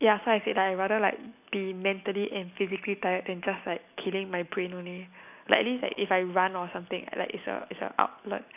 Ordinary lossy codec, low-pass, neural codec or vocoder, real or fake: none; 3.6 kHz; none; real